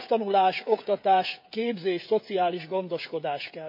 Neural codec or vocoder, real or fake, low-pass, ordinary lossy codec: codec, 16 kHz, 16 kbps, FreqCodec, smaller model; fake; 5.4 kHz; none